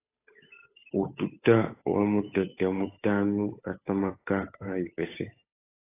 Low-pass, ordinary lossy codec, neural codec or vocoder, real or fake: 3.6 kHz; AAC, 24 kbps; codec, 16 kHz, 8 kbps, FunCodec, trained on Chinese and English, 25 frames a second; fake